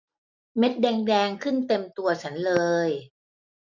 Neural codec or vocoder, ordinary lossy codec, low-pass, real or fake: none; none; 7.2 kHz; real